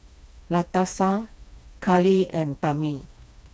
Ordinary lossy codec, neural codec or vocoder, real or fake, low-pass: none; codec, 16 kHz, 2 kbps, FreqCodec, smaller model; fake; none